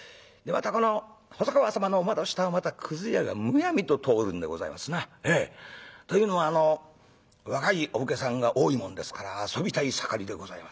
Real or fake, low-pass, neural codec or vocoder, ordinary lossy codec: real; none; none; none